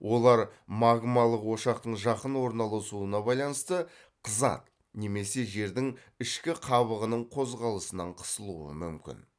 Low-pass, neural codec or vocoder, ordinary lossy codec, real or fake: none; none; none; real